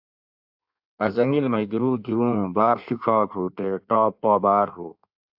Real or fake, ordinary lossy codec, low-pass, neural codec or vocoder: fake; AAC, 48 kbps; 5.4 kHz; codec, 16 kHz in and 24 kHz out, 1.1 kbps, FireRedTTS-2 codec